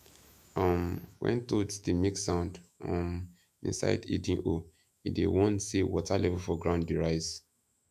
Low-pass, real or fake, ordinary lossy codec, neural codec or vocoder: 14.4 kHz; fake; none; autoencoder, 48 kHz, 128 numbers a frame, DAC-VAE, trained on Japanese speech